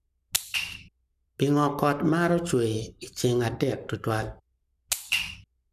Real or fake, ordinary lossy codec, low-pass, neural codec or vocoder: fake; none; 14.4 kHz; codec, 44.1 kHz, 7.8 kbps, Pupu-Codec